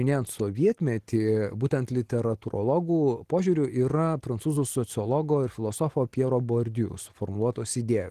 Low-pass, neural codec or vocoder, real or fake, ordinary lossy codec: 14.4 kHz; none; real; Opus, 16 kbps